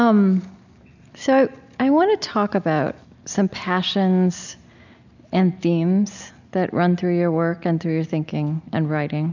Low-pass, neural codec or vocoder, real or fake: 7.2 kHz; none; real